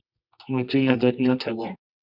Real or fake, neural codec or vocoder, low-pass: fake; codec, 24 kHz, 0.9 kbps, WavTokenizer, medium music audio release; 5.4 kHz